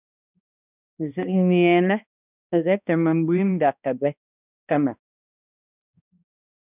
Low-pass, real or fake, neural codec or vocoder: 3.6 kHz; fake; codec, 16 kHz, 1 kbps, X-Codec, HuBERT features, trained on balanced general audio